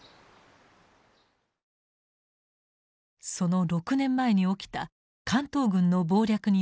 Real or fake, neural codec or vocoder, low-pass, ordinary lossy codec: real; none; none; none